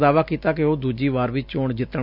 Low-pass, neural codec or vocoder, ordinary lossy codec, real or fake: 5.4 kHz; none; none; real